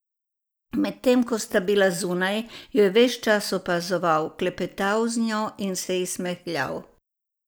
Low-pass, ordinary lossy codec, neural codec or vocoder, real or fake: none; none; none; real